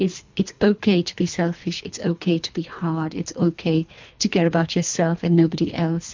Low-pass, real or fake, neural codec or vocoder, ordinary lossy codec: 7.2 kHz; fake; codec, 24 kHz, 3 kbps, HILCodec; MP3, 64 kbps